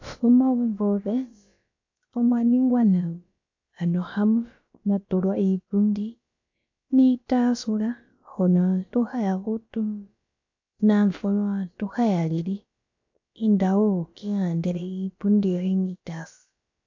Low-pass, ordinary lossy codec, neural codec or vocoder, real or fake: 7.2 kHz; AAC, 48 kbps; codec, 16 kHz, about 1 kbps, DyCAST, with the encoder's durations; fake